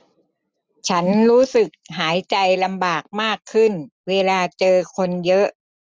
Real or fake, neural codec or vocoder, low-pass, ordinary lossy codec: real; none; none; none